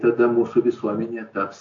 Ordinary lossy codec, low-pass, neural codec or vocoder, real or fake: AAC, 48 kbps; 7.2 kHz; none; real